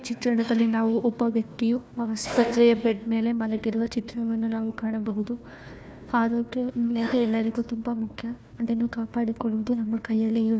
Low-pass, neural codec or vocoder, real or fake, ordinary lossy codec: none; codec, 16 kHz, 1 kbps, FunCodec, trained on Chinese and English, 50 frames a second; fake; none